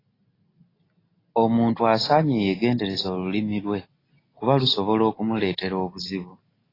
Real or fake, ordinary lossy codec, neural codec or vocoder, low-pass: real; AAC, 24 kbps; none; 5.4 kHz